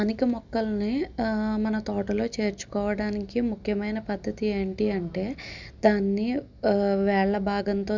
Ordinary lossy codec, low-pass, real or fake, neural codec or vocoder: none; 7.2 kHz; real; none